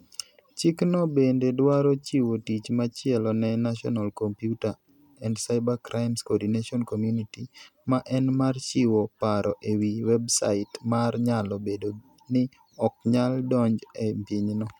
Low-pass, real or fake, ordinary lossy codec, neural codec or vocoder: 19.8 kHz; real; none; none